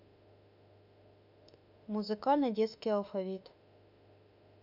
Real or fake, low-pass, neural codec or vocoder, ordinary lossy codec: fake; 5.4 kHz; autoencoder, 48 kHz, 32 numbers a frame, DAC-VAE, trained on Japanese speech; none